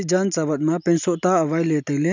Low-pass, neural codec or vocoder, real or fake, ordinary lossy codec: 7.2 kHz; none; real; none